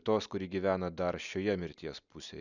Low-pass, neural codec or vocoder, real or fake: 7.2 kHz; none; real